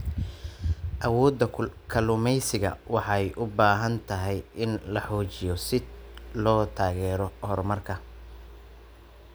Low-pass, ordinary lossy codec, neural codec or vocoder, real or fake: none; none; none; real